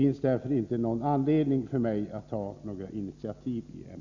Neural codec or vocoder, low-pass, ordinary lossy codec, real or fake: none; 7.2 kHz; none; real